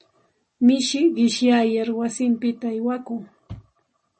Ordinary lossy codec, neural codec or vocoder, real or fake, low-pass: MP3, 32 kbps; none; real; 10.8 kHz